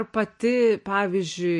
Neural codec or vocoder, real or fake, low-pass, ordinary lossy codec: none; real; 10.8 kHz; MP3, 48 kbps